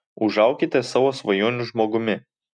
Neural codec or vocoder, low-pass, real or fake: none; 9.9 kHz; real